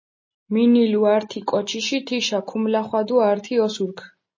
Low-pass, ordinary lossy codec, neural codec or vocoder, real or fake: 7.2 kHz; MP3, 32 kbps; none; real